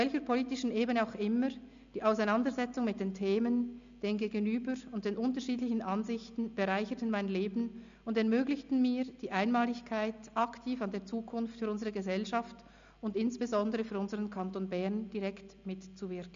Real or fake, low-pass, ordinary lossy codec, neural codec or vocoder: real; 7.2 kHz; MP3, 64 kbps; none